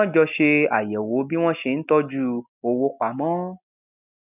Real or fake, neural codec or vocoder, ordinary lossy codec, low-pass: real; none; none; 3.6 kHz